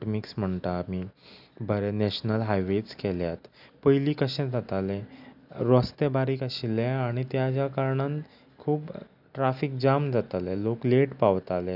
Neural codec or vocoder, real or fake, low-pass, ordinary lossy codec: none; real; 5.4 kHz; none